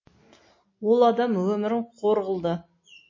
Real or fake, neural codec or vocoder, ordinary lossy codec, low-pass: real; none; MP3, 32 kbps; 7.2 kHz